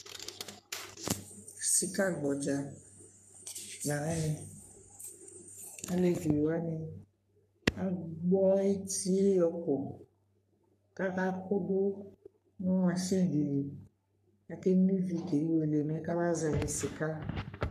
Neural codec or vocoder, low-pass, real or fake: codec, 44.1 kHz, 3.4 kbps, Pupu-Codec; 14.4 kHz; fake